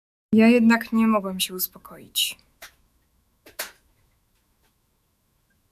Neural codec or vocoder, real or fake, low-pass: autoencoder, 48 kHz, 128 numbers a frame, DAC-VAE, trained on Japanese speech; fake; 14.4 kHz